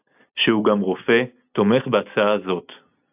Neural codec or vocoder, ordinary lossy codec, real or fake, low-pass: none; AAC, 32 kbps; real; 3.6 kHz